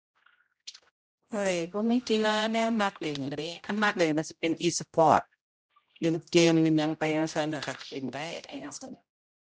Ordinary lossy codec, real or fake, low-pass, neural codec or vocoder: none; fake; none; codec, 16 kHz, 0.5 kbps, X-Codec, HuBERT features, trained on general audio